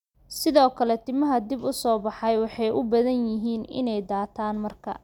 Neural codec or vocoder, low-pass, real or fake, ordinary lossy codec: none; 19.8 kHz; real; none